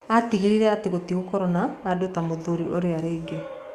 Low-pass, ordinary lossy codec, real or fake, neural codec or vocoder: 14.4 kHz; Opus, 64 kbps; fake; codec, 44.1 kHz, 7.8 kbps, DAC